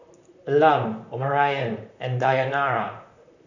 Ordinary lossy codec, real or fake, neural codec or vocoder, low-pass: none; fake; vocoder, 44.1 kHz, 128 mel bands, Pupu-Vocoder; 7.2 kHz